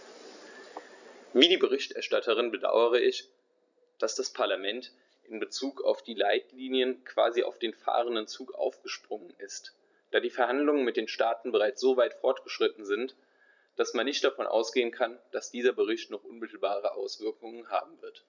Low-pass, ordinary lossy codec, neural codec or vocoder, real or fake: 7.2 kHz; none; none; real